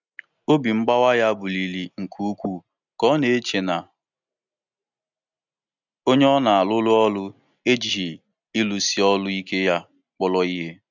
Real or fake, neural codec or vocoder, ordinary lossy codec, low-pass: real; none; none; 7.2 kHz